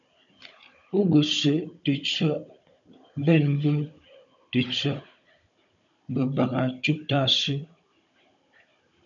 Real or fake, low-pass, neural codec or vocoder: fake; 7.2 kHz; codec, 16 kHz, 16 kbps, FunCodec, trained on Chinese and English, 50 frames a second